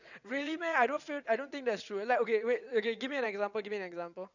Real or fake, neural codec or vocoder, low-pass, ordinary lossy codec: fake; vocoder, 22.05 kHz, 80 mel bands, WaveNeXt; 7.2 kHz; none